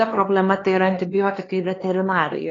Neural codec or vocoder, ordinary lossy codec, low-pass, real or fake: codec, 16 kHz, 2 kbps, X-Codec, HuBERT features, trained on LibriSpeech; AAC, 32 kbps; 7.2 kHz; fake